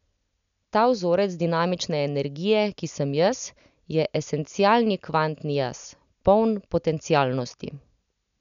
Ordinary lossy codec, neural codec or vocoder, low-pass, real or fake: none; none; 7.2 kHz; real